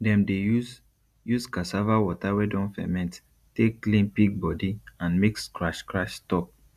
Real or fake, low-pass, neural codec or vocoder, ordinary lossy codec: real; 14.4 kHz; none; none